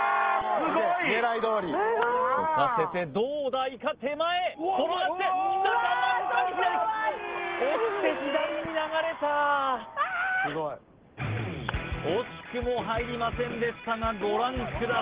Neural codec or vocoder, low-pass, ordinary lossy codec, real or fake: none; 3.6 kHz; Opus, 16 kbps; real